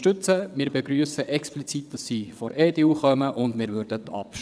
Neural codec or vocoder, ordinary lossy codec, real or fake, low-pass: vocoder, 22.05 kHz, 80 mel bands, WaveNeXt; none; fake; none